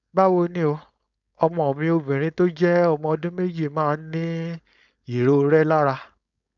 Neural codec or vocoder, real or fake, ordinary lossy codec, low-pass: codec, 16 kHz, 4.8 kbps, FACodec; fake; none; 7.2 kHz